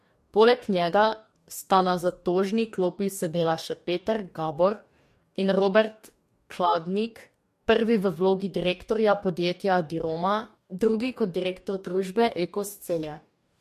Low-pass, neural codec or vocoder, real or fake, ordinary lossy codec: 14.4 kHz; codec, 44.1 kHz, 2.6 kbps, DAC; fake; MP3, 64 kbps